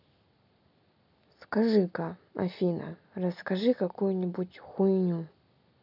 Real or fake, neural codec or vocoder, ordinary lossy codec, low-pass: real; none; none; 5.4 kHz